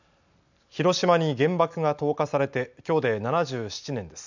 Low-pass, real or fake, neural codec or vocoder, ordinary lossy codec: 7.2 kHz; real; none; none